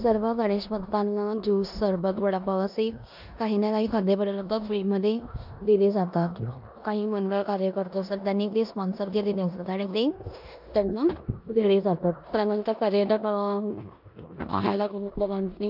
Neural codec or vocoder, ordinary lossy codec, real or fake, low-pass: codec, 16 kHz in and 24 kHz out, 0.9 kbps, LongCat-Audio-Codec, four codebook decoder; AAC, 48 kbps; fake; 5.4 kHz